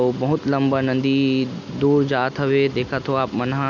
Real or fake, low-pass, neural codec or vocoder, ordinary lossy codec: real; 7.2 kHz; none; none